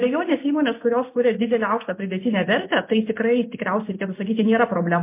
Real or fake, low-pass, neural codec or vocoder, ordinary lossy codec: real; 3.6 kHz; none; MP3, 24 kbps